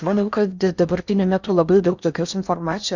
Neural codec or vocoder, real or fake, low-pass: codec, 16 kHz in and 24 kHz out, 0.6 kbps, FocalCodec, streaming, 4096 codes; fake; 7.2 kHz